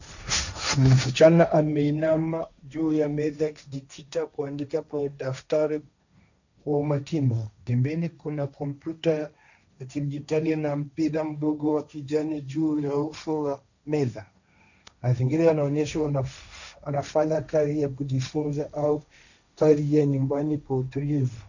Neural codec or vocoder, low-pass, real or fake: codec, 16 kHz, 1.1 kbps, Voila-Tokenizer; 7.2 kHz; fake